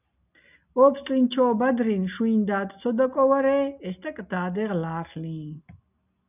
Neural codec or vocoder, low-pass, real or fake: none; 3.6 kHz; real